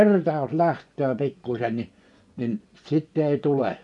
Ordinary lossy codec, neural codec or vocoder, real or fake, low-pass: none; vocoder, 24 kHz, 100 mel bands, Vocos; fake; 10.8 kHz